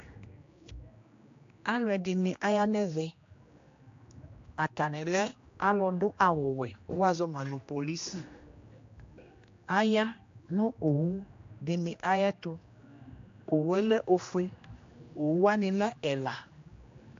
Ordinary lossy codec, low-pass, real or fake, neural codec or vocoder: AAC, 48 kbps; 7.2 kHz; fake; codec, 16 kHz, 1 kbps, X-Codec, HuBERT features, trained on general audio